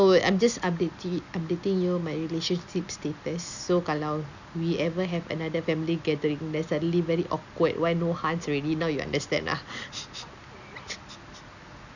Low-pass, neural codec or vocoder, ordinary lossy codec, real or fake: 7.2 kHz; none; none; real